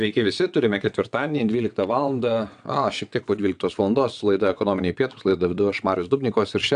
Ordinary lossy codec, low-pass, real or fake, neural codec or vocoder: AAC, 96 kbps; 9.9 kHz; fake; vocoder, 22.05 kHz, 80 mel bands, WaveNeXt